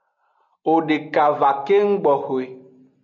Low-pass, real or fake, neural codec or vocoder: 7.2 kHz; real; none